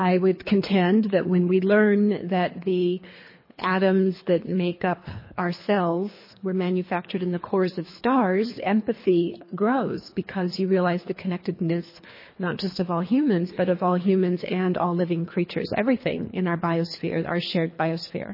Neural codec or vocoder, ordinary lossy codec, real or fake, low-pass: codec, 24 kHz, 3 kbps, HILCodec; MP3, 24 kbps; fake; 5.4 kHz